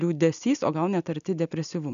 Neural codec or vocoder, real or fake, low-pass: none; real; 7.2 kHz